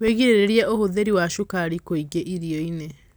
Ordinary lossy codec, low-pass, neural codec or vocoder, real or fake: none; none; none; real